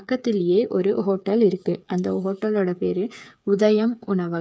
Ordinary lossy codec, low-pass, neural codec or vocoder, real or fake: none; none; codec, 16 kHz, 8 kbps, FreqCodec, smaller model; fake